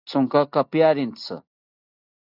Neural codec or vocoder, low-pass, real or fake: none; 5.4 kHz; real